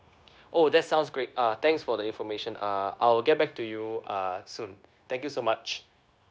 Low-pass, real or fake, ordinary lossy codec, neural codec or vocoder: none; fake; none; codec, 16 kHz, 0.9 kbps, LongCat-Audio-Codec